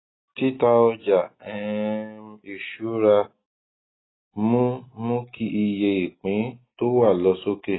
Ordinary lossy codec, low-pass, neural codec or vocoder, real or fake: AAC, 16 kbps; 7.2 kHz; none; real